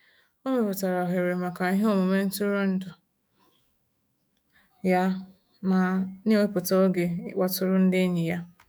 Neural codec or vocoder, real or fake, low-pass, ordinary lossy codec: autoencoder, 48 kHz, 128 numbers a frame, DAC-VAE, trained on Japanese speech; fake; none; none